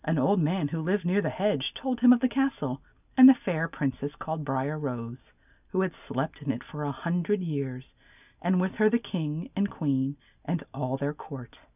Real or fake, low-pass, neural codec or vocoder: real; 3.6 kHz; none